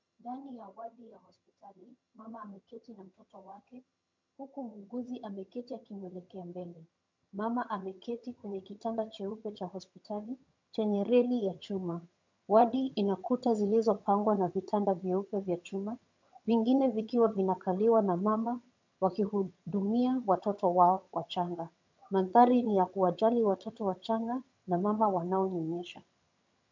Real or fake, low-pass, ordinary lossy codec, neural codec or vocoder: fake; 7.2 kHz; MP3, 64 kbps; vocoder, 22.05 kHz, 80 mel bands, HiFi-GAN